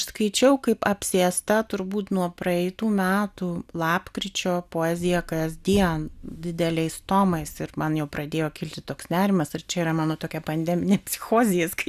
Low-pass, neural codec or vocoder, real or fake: 14.4 kHz; none; real